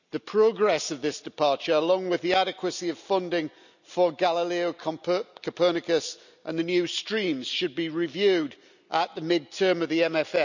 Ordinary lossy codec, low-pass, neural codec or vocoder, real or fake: none; 7.2 kHz; none; real